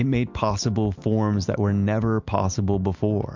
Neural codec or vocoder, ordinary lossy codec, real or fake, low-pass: none; MP3, 64 kbps; real; 7.2 kHz